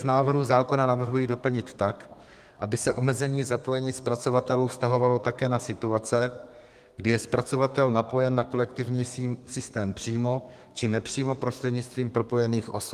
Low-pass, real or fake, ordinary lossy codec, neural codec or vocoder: 14.4 kHz; fake; Opus, 32 kbps; codec, 32 kHz, 1.9 kbps, SNAC